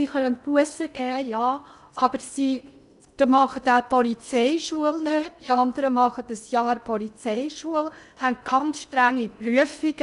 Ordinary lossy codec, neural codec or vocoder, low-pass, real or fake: none; codec, 16 kHz in and 24 kHz out, 0.6 kbps, FocalCodec, streaming, 2048 codes; 10.8 kHz; fake